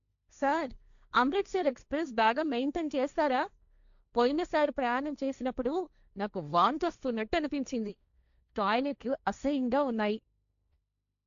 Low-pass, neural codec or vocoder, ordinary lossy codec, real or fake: 7.2 kHz; codec, 16 kHz, 1.1 kbps, Voila-Tokenizer; none; fake